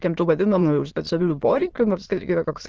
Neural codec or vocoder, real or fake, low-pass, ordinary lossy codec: autoencoder, 22.05 kHz, a latent of 192 numbers a frame, VITS, trained on many speakers; fake; 7.2 kHz; Opus, 16 kbps